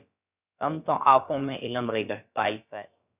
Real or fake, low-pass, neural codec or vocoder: fake; 3.6 kHz; codec, 16 kHz, about 1 kbps, DyCAST, with the encoder's durations